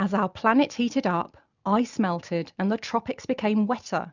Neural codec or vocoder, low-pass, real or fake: none; 7.2 kHz; real